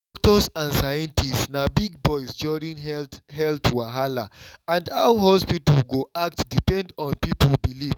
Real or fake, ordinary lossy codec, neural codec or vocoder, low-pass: fake; none; codec, 44.1 kHz, 7.8 kbps, DAC; 19.8 kHz